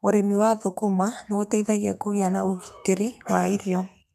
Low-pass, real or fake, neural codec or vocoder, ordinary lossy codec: 14.4 kHz; fake; codec, 32 kHz, 1.9 kbps, SNAC; none